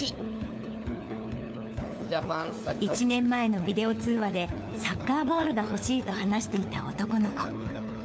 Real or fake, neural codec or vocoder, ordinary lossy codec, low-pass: fake; codec, 16 kHz, 4 kbps, FunCodec, trained on LibriTTS, 50 frames a second; none; none